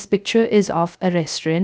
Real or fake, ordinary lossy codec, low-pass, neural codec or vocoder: fake; none; none; codec, 16 kHz, 0.3 kbps, FocalCodec